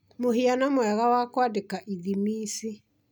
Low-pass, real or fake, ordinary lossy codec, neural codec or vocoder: none; real; none; none